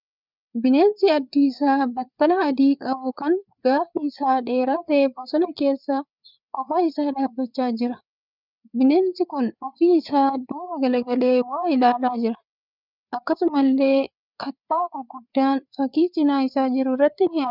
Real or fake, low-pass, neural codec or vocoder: fake; 5.4 kHz; codec, 16 kHz, 4 kbps, FreqCodec, larger model